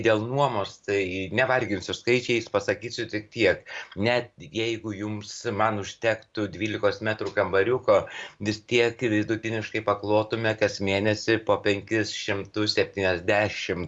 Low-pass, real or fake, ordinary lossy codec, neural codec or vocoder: 10.8 kHz; real; Opus, 64 kbps; none